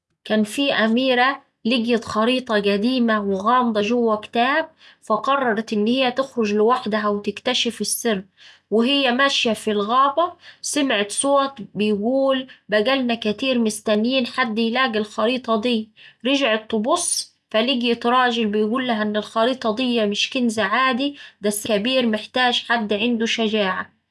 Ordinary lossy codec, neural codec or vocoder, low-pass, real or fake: none; none; none; real